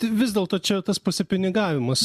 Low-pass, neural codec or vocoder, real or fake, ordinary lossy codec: 14.4 kHz; none; real; MP3, 96 kbps